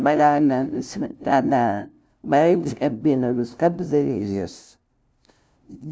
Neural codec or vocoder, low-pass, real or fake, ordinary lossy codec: codec, 16 kHz, 0.5 kbps, FunCodec, trained on LibriTTS, 25 frames a second; none; fake; none